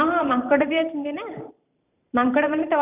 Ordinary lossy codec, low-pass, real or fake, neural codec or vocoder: none; 3.6 kHz; fake; vocoder, 44.1 kHz, 128 mel bands every 512 samples, BigVGAN v2